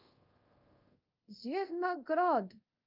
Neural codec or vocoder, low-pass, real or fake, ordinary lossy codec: codec, 24 kHz, 0.5 kbps, DualCodec; 5.4 kHz; fake; Opus, 24 kbps